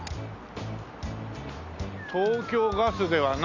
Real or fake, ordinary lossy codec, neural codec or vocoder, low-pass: real; none; none; 7.2 kHz